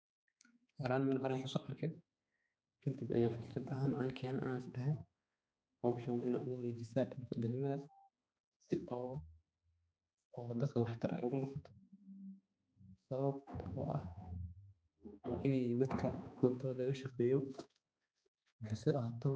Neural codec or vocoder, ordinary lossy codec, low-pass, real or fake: codec, 16 kHz, 2 kbps, X-Codec, HuBERT features, trained on balanced general audio; none; none; fake